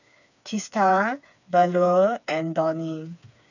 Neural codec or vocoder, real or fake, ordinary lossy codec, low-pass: codec, 16 kHz, 4 kbps, FreqCodec, smaller model; fake; none; 7.2 kHz